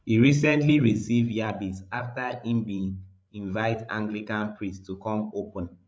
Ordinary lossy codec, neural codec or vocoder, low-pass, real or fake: none; codec, 16 kHz, 16 kbps, FreqCodec, larger model; none; fake